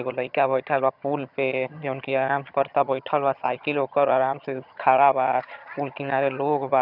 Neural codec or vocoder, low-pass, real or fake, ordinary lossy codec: vocoder, 22.05 kHz, 80 mel bands, HiFi-GAN; 5.4 kHz; fake; none